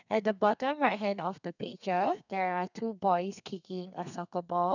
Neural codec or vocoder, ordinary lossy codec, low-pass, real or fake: codec, 32 kHz, 1.9 kbps, SNAC; none; 7.2 kHz; fake